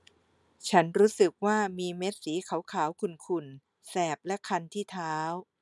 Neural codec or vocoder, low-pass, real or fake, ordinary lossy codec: none; none; real; none